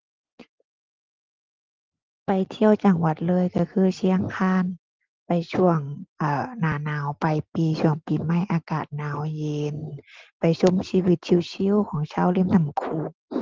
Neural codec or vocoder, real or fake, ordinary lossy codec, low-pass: none; real; Opus, 16 kbps; 7.2 kHz